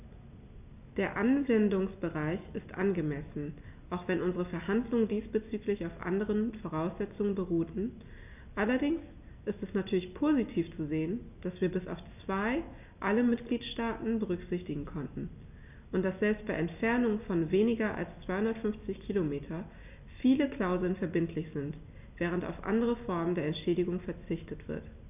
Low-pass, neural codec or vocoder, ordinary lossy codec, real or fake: 3.6 kHz; none; none; real